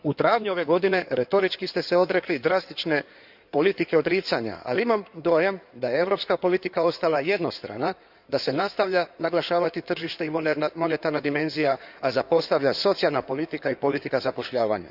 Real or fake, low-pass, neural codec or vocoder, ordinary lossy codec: fake; 5.4 kHz; codec, 16 kHz in and 24 kHz out, 2.2 kbps, FireRedTTS-2 codec; none